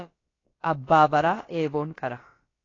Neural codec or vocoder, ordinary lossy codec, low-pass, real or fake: codec, 16 kHz, about 1 kbps, DyCAST, with the encoder's durations; AAC, 32 kbps; 7.2 kHz; fake